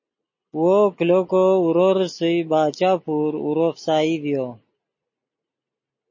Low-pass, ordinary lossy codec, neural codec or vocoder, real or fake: 7.2 kHz; MP3, 32 kbps; none; real